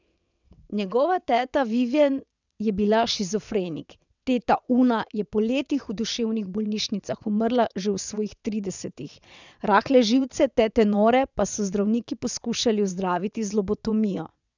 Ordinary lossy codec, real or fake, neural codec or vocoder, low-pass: none; fake; vocoder, 22.05 kHz, 80 mel bands, WaveNeXt; 7.2 kHz